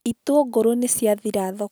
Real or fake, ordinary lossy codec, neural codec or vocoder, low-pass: real; none; none; none